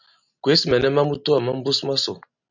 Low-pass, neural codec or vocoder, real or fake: 7.2 kHz; none; real